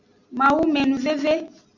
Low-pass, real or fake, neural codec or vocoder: 7.2 kHz; real; none